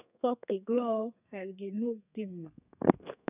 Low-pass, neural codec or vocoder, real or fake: 3.6 kHz; codec, 32 kHz, 1.9 kbps, SNAC; fake